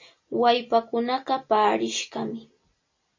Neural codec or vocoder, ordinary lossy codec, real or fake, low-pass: none; MP3, 32 kbps; real; 7.2 kHz